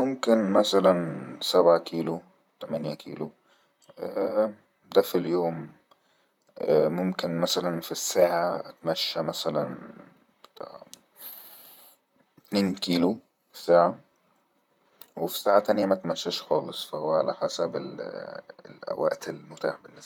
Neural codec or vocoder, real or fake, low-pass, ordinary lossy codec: vocoder, 44.1 kHz, 128 mel bands, Pupu-Vocoder; fake; 19.8 kHz; none